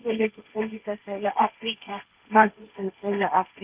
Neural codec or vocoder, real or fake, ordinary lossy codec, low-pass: codec, 16 kHz, 1.1 kbps, Voila-Tokenizer; fake; Opus, 24 kbps; 3.6 kHz